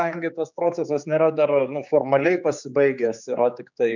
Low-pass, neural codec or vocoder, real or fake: 7.2 kHz; codec, 16 kHz, 4 kbps, X-Codec, HuBERT features, trained on general audio; fake